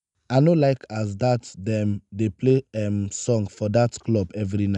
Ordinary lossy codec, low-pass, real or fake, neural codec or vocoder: none; 10.8 kHz; real; none